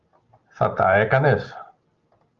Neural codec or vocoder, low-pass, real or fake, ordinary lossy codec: none; 7.2 kHz; real; Opus, 32 kbps